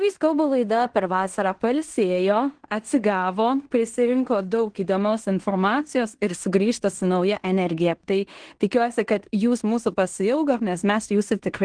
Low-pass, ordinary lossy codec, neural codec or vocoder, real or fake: 9.9 kHz; Opus, 16 kbps; codec, 16 kHz in and 24 kHz out, 0.9 kbps, LongCat-Audio-Codec, fine tuned four codebook decoder; fake